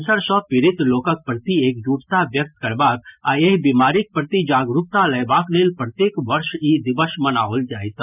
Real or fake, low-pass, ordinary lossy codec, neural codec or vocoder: real; 3.6 kHz; none; none